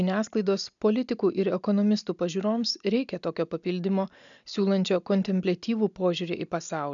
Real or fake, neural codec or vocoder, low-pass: real; none; 7.2 kHz